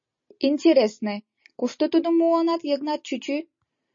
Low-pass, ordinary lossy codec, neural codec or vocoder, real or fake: 7.2 kHz; MP3, 32 kbps; none; real